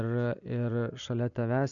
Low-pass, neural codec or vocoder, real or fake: 7.2 kHz; none; real